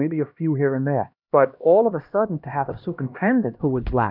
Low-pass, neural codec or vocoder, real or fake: 5.4 kHz; codec, 16 kHz, 1 kbps, X-Codec, HuBERT features, trained on LibriSpeech; fake